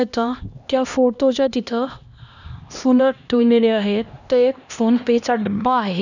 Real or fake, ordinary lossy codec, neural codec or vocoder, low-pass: fake; none; codec, 16 kHz, 1 kbps, X-Codec, HuBERT features, trained on LibriSpeech; 7.2 kHz